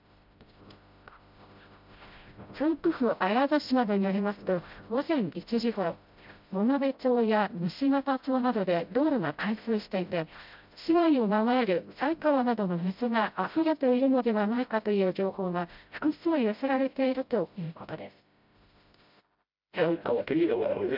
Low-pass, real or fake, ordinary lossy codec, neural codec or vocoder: 5.4 kHz; fake; MP3, 48 kbps; codec, 16 kHz, 0.5 kbps, FreqCodec, smaller model